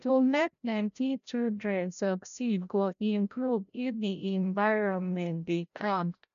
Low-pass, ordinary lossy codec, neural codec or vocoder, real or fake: 7.2 kHz; none; codec, 16 kHz, 0.5 kbps, FreqCodec, larger model; fake